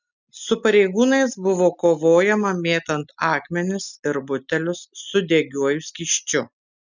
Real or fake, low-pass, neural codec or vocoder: real; 7.2 kHz; none